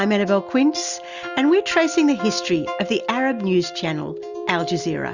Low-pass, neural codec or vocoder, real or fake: 7.2 kHz; none; real